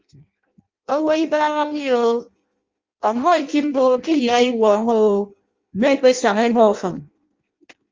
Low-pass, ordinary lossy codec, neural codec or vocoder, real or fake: 7.2 kHz; Opus, 32 kbps; codec, 16 kHz in and 24 kHz out, 0.6 kbps, FireRedTTS-2 codec; fake